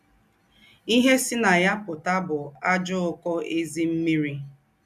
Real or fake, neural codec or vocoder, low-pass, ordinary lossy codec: real; none; 14.4 kHz; none